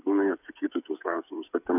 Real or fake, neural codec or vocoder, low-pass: fake; codec, 16 kHz, 8 kbps, FreqCodec, smaller model; 3.6 kHz